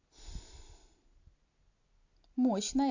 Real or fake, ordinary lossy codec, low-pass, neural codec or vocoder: real; AAC, 48 kbps; 7.2 kHz; none